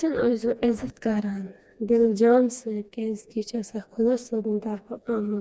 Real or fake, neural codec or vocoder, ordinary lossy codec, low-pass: fake; codec, 16 kHz, 2 kbps, FreqCodec, smaller model; none; none